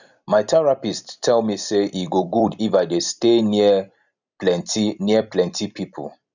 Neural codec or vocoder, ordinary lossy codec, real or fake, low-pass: vocoder, 44.1 kHz, 128 mel bands every 256 samples, BigVGAN v2; none; fake; 7.2 kHz